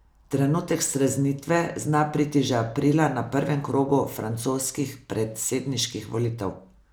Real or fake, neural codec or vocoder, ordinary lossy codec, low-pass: real; none; none; none